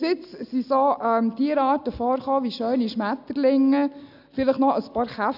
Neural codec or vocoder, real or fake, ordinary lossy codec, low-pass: none; real; none; 5.4 kHz